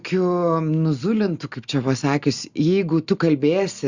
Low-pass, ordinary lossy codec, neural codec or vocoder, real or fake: 7.2 kHz; Opus, 64 kbps; none; real